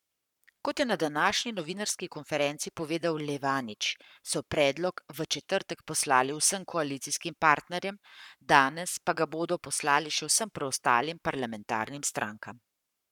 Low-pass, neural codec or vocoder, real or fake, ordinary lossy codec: 19.8 kHz; codec, 44.1 kHz, 7.8 kbps, Pupu-Codec; fake; none